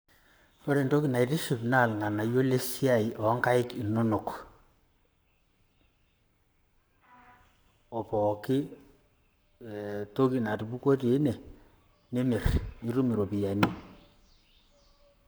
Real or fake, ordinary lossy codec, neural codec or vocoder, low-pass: fake; none; codec, 44.1 kHz, 7.8 kbps, Pupu-Codec; none